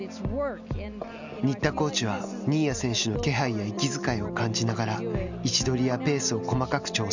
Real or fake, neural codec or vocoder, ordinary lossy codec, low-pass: real; none; none; 7.2 kHz